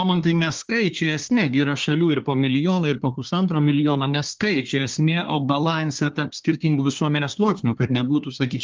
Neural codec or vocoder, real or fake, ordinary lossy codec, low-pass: codec, 24 kHz, 1 kbps, SNAC; fake; Opus, 32 kbps; 7.2 kHz